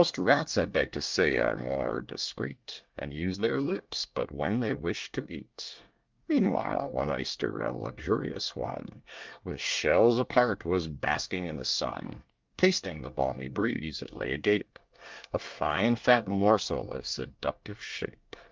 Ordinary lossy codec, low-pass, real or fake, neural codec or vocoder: Opus, 24 kbps; 7.2 kHz; fake; codec, 24 kHz, 1 kbps, SNAC